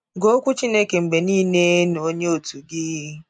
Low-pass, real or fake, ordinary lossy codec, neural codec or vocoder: 9.9 kHz; real; none; none